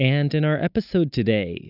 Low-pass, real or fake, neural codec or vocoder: 5.4 kHz; real; none